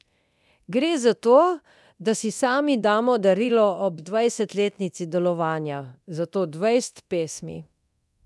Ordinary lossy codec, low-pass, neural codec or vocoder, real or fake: none; none; codec, 24 kHz, 0.9 kbps, DualCodec; fake